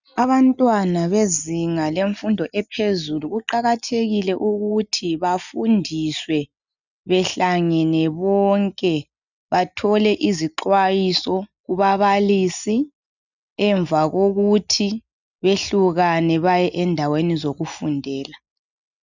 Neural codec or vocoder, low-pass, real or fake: none; 7.2 kHz; real